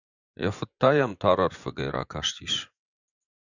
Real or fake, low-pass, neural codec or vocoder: fake; 7.2 kHz; vocoder, 24 kHz, 100 mel bands, Vocos